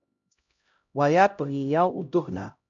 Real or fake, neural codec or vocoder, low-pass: fake; codec, 16 kHz, 0.5 kbps, X-Codec, HuBERT features, trained on LibriSpeech; 7.2 kHz